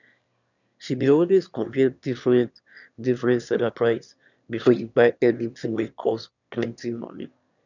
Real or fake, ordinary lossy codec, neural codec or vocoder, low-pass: fake; none; autoencoder, 22.05 kHz, a latent of 192 numbers a frame, VITS, trained on one speaker; 7.2 kHz